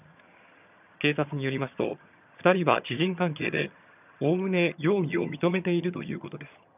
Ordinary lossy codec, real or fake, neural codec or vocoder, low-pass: none; fake; vocoder, 22.05 kHz, 80 mel bands, HiFi-GAN; 3.6 kHz